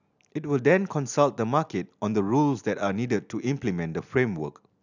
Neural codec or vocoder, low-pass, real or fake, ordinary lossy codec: none; 7.2 kHz; real; none